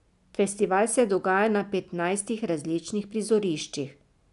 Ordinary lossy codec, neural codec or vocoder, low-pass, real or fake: none; none; 10.8 kHz; real